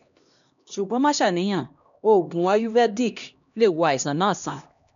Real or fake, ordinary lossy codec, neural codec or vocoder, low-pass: fake; none; codec, 16 kHz, 1 kbps, X-Codec, HuBERT features, trained on LibriSpeech; 7.2 kHz